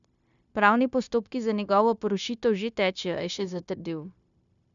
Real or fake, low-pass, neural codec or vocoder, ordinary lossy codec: fake; 7.2 kHz; codec, 16 kHz, 0.9 kbps, LongCat-Audio-Codec; none